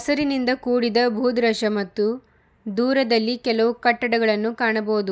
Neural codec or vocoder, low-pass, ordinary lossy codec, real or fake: none; none; none; real